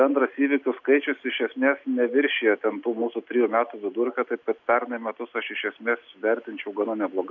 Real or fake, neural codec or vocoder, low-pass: real; none; 7.2 kHz